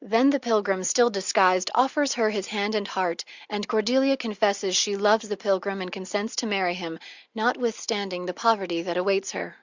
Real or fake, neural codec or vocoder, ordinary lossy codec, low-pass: real; none; Opus, 64 kbps; 7.2 kHz